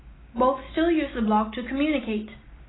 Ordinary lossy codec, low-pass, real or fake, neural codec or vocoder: AAC, 16 kbps; 7.2 kHz; real; none